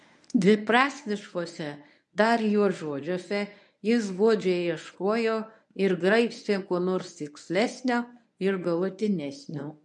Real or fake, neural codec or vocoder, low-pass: fake; codec, 24 kHz, 0.9 kbps, WavTokenizer, medium speech release version 1; 10.8 kHz